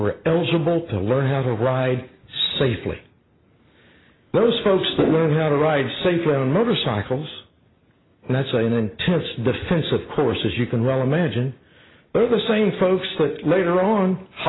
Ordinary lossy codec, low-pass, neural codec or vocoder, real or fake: AAC, 16 kbps; 7.2 kHz; none; real